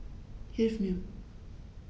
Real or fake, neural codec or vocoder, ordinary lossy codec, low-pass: real; none; none; none